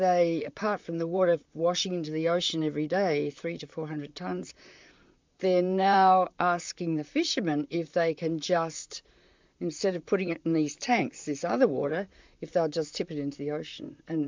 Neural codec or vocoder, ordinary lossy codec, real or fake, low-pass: vocoder, 44.1 kHz, 128 mel bands, Pupu-Vocoder; MP3, 64 kbps; fake; 7.2 kHz